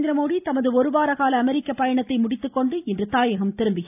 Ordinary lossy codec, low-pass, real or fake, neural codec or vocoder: AAC, 32 kbps; 3.6 kHz; real; none